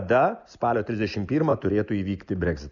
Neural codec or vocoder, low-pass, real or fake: none; 7.2 kHz; real